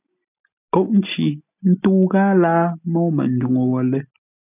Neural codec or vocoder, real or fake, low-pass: none; real; 3.6 kHz